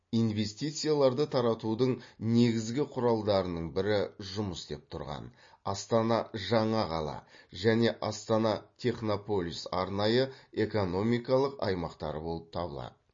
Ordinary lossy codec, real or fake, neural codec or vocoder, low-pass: MP3, 32 kbps; real; none; 7.2 kHz